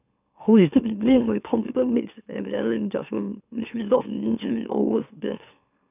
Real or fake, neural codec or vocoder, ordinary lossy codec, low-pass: fake; autoencoder, 44.1 kHz, a latent of 192 numbers a frame, MeloTTS; none; 3.6 kHz